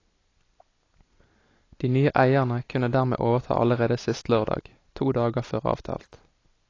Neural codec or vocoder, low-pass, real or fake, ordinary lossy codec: none; 7.2 kHz; real; AAC, 32 kbps